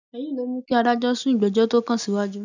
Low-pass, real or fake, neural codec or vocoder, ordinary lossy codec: 7.2 kHz; real; none; none